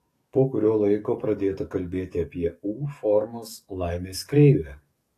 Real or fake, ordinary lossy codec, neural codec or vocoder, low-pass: fake; AAC, 48 kbps; codec, 44.1 kHz, 7.8 kbps, DAC; 14.4 kHz